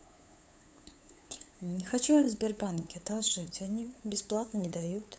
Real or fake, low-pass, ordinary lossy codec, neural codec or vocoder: fake; none; none; codec, 16 kHz, 8 kbps, FunCodec, trained on LibriTTS, 25 frames a second